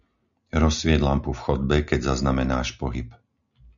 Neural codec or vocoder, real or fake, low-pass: none; real; 7.2 kHz